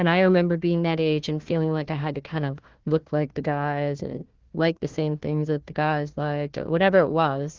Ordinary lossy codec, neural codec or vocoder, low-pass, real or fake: Opus, 16 kbps; codec, 16 kHz, 1 kbps, FunCodec, trained on Chinese and English, 50 frames a second; 7.2 kHz; fake